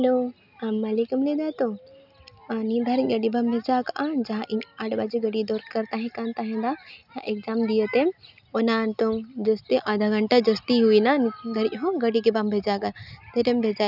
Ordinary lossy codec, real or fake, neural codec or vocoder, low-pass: none; real; none; 5.4 kHz